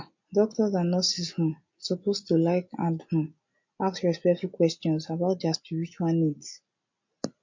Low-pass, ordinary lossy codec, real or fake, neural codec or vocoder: 7.2 kHz; MP3, 48 kbps; real; none